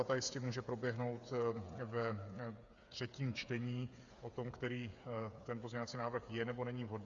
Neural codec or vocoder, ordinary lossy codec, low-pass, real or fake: codec, 16 kHz, 8 kbps, FreqCodec, smaller model; Opus, 64 kbps; 7.2 kHz; fake